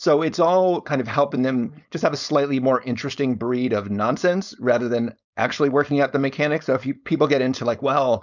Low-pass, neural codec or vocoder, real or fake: 7.2 kHz; codec, 16 kHz, 4.8 kbps, FACodec; fake